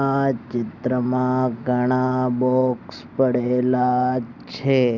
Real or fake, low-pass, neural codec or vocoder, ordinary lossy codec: real; 7.2 kHz; none; none